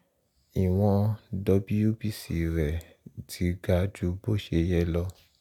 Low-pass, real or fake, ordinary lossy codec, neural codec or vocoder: 19.8 kHz; real; none; none